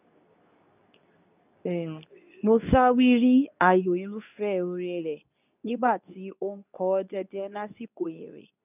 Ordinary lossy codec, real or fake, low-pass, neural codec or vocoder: none; fake; 3.6 kHz; codec, 24 kHz, 0.9 kbps, WavTokenizer, medium speech release version 2